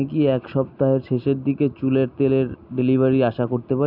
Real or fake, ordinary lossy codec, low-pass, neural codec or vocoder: real; none; 5.4 kHz; none